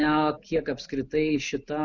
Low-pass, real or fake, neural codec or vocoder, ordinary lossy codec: 7.2 kHz; real; none; Opus, 64 kbps